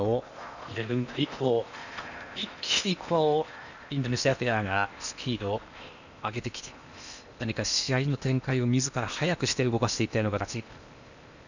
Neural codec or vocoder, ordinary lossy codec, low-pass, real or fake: codec, 16 kHz in and 24 kHz out, 0.8 kbps, FocalCodec, streaming, 65536 codes; none; 7.2 kHz; fake